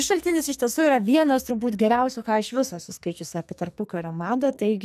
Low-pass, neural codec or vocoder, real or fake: 14.4 kHz; codec, 44.1 kHz, 2.6 kbps, SNAC; fake